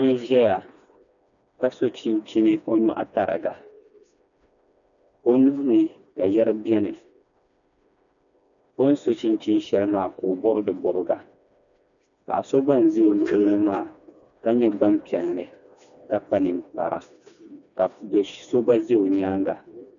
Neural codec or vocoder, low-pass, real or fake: codec, 16 kHz, 2 kbps, FreqCodec, smaller model; 7.2 kHz; fake